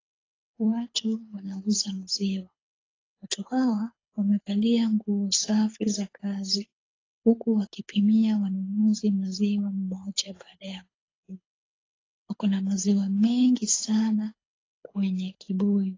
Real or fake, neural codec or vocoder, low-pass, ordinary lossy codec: fake; codec, 24 kHz, 6 kbps, HILCodec; 7.2 kHz; AAC, 32 kbps